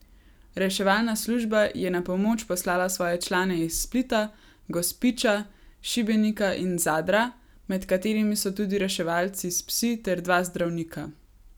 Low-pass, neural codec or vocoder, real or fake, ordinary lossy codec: none; none; real; none